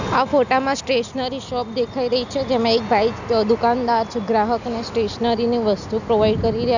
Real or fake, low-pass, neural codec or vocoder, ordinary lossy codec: real; 7.2 kHz; none; none